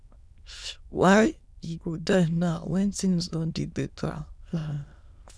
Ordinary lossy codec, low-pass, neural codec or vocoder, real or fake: none; none; autoencoder, 22.05 kHz, a latent of 192 numbers a frame, VITS, trained on many speakers; fake